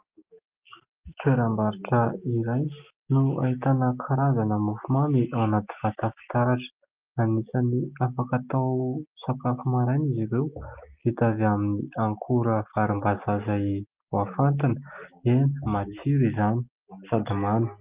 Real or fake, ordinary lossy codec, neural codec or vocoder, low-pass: real; Opus, 24 kbps; none; 3.6 kHz